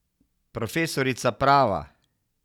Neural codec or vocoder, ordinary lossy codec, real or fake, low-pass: none; none; real; 19.8 kHz